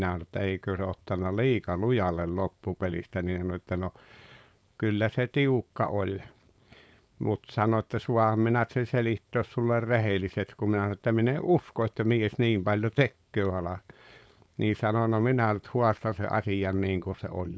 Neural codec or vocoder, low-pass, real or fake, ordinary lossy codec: codec, 16 kHz, 4.8 kbps, FACodec; none; fake; none